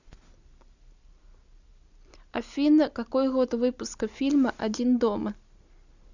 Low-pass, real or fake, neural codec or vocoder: 7.2 kHz; real; none